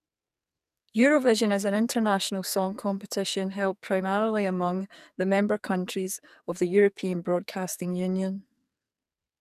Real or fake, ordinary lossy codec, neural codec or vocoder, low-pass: fake; none; codec, 44.1 kHz, 2.6 kbps, SNAC; 14.4 kHz